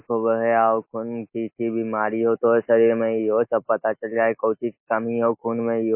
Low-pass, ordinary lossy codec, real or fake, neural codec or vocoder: 3.6 kHz; MP3, 24 kbps; real; none